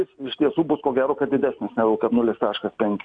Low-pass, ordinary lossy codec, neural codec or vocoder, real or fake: 9.9 kHz; AAC, 64 kbps; none; real